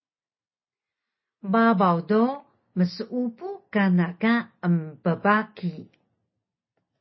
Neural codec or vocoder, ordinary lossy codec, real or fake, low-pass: none; MP3, 24 kbps; real; 7.2 kHz